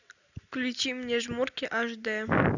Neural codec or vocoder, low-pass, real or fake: none; 7.2 kHz; real